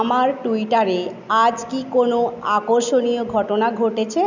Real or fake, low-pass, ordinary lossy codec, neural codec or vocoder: real; 7.2 kHz; none; none